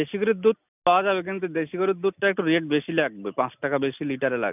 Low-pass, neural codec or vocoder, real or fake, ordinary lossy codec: 3.6 kHz; none; real; none